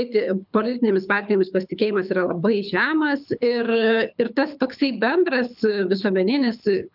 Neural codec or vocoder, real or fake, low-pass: codec, 24 kHz, 6 kbps, HILCodec; fake; 5.4 kHz